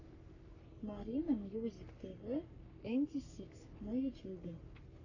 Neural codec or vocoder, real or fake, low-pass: codec, 44.1 kHz, 3.4 kbps, Pupu-Codec; fake; 7.2 kHz